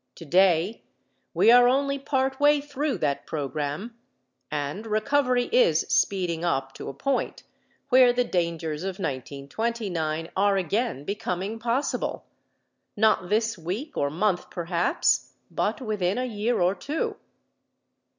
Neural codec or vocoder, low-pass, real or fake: none; 7.2 kHz; real